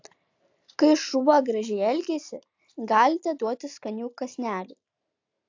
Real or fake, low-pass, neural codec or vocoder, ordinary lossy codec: real; 7.2 kHz; none; AAC, 48 kbps